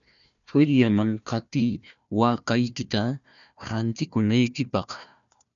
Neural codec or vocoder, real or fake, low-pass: codec, 16 kHz, 1 kbps, FunCodec, trained on Chinese and English, 50 frames a second; fake; 7.2 kHz